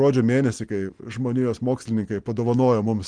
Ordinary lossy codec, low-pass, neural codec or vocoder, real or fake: Opus, 24 kbps; 9.9 kHz; none; real